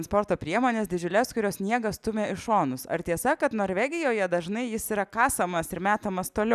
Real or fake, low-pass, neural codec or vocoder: real; 14.4 kHz; none